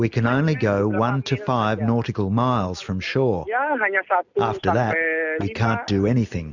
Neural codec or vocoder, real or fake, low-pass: none; real; 7.2 kHz